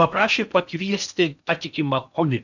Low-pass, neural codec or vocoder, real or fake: 7.2 kHz; codec, 16 kHz in and 24 kHz out, 0.8 kbps, FocalCodec, streaming, 65536 codes; fake